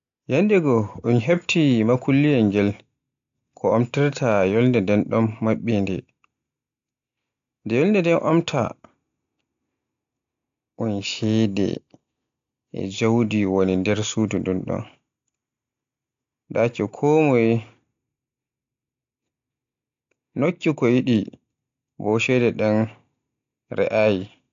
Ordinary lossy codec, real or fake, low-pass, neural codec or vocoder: AAC, 64 kbps; real; 7.2 kHz; none